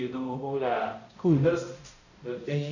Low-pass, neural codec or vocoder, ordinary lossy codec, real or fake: 7.2 kHz; codec, 16 kHz, 0.5 kbps, X-Codec, HuBERT features, trained on balanced general audio; none; fake